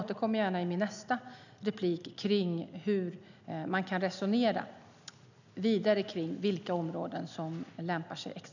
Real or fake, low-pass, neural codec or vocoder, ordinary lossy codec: real; 7.2 kHz; none; none